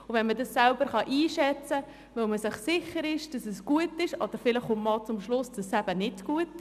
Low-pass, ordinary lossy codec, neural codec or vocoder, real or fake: 14.4 kHz; none; none; real